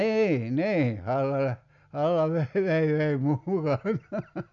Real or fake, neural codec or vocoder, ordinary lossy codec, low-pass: real; none; none; 7.2 kHz